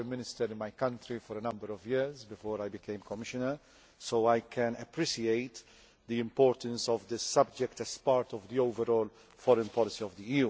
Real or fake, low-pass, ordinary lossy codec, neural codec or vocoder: real; none; none; none